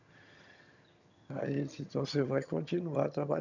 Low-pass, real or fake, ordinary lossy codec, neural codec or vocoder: 7.2 kHz; fake; none; vocoder, 22.05 kHz, 80 mel bands, HiFi-GAN